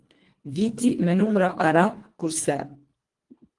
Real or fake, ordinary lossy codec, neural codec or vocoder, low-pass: fake; Opus, 24 kbps; codec, 24 kHz, 1.5 kbps, HILCodec; 10.8 kHz